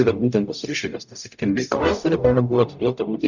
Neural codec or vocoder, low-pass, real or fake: codec, 44.1 kHz, 0.9 kbps, DAC; 7.2 kHz; fake